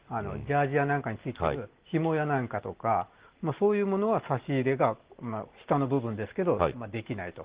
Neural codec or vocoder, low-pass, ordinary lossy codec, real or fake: none; 3.6 kHz; Opus, 16 kbps; real